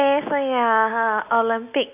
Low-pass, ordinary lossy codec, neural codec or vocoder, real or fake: 3.6 kHz; none; none; real